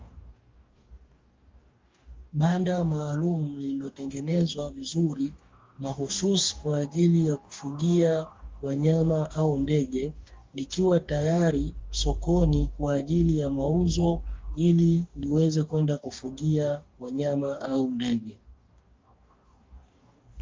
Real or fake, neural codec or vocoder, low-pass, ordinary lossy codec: fake; codec, 44.1 kHz, 2.6 kbps, DAC; 7.2 kHz; Opus, 32 kbps